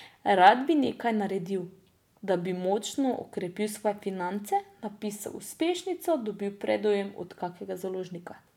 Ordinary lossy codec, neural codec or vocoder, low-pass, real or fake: none; none; 19.8 kHz; real